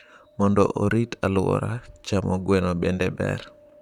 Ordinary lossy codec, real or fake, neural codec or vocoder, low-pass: none; fake; vocoder, 44.1 kHz, 128 mel bands, Pupu-Vocoder; 19.8 kHz